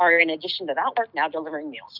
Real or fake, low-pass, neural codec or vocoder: real; 5.4 kHz; none